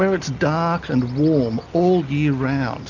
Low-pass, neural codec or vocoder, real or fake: 7.2 kHz; none; real